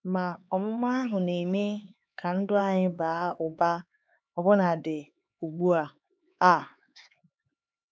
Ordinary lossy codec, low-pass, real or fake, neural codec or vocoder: none; none; fake; codec, 16 kHz, 4 kbps, X-Codec, HuBERT features, trained on LibriSpeech